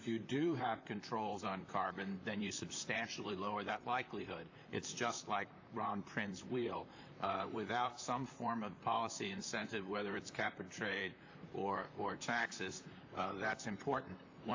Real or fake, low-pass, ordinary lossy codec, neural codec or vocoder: fake; 7.2 kHz; AAC, 48 kbps; vocoder, 44.1 kHz, 128 mel bands, Pupu-Vocoder